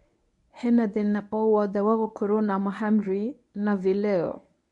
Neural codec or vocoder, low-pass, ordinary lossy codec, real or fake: codec, 24 kHz, 0.9 kbps, WavTokenizer, medium speech release version 1; 10.8 kHz; none; fake